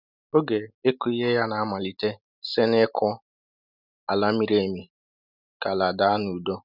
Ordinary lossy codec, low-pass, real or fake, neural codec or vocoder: none; 5.4 kHz; real; none